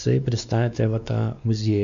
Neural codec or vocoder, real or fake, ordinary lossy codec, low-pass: codec, 16 kHz, 2 kbps, X-Codec, WavLM features, trained on Multilingual LibriSpeech; fake; MP3, 64 kbps; 7.2 kHz